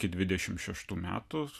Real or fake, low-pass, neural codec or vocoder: real; 14.4 kHz; none